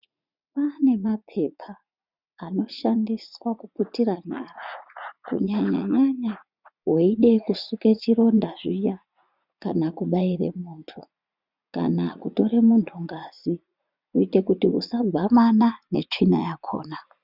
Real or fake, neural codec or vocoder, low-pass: fake; vocoder, 44.1 kHz, 80 mel bands, Vocos; 5.4 kHz